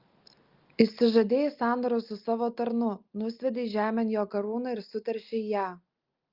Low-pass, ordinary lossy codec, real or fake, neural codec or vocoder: 5.4 kHz; Opus, 32 kbps; real; none